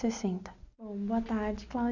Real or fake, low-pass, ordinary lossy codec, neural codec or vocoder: real; 7.2 kHz; none; none